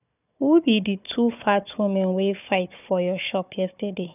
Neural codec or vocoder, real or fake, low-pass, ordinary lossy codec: codec, 16 kHz, 4 kbps, FunCodec, trained on Chinese and English, 50 frames a second; fake; 3.6 kHz; none